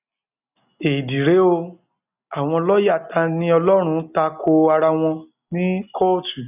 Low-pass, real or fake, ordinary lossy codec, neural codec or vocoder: 3.6 kHz; real; none; none